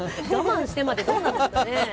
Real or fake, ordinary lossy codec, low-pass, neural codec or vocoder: real; none; none; none